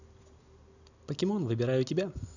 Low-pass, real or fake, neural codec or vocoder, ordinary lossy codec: 7.2 kHz; real; none; none